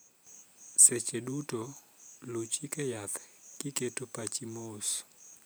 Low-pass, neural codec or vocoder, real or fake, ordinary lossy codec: none; vocoder, 44.1 kHz, 128 mel bands every 512 samples, BigVGAN v2; fake; none